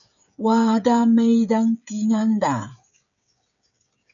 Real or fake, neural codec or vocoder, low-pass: fake; codec, 16 kHz, 16 kbps, FreqCodec, smaller model; 7.2 kHz